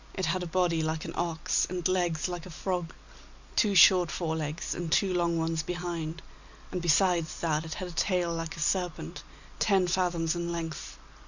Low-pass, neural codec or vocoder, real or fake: 7.2 kHz; none; real